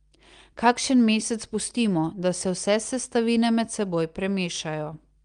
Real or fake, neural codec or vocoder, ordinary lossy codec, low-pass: real; none; Opus, 32 kbps; 9.9 kHz